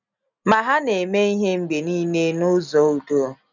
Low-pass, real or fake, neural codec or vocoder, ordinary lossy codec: 7.2 kHz; real; none; none